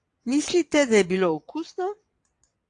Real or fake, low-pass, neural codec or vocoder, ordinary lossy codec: fake; 9.9 kHz; vocoder, 22.05 kHz, 80 mel bands, WaveNeXt; AAC, 64 kbps